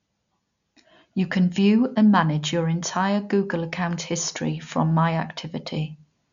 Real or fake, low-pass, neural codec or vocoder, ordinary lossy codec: real; 7.2 kHz; none; none